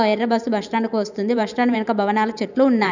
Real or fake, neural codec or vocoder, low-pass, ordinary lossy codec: fake; vocoder, 44.1 kHz, 128 mel bands every 512 samples, BigVGAN v2; 7.2 kHz; none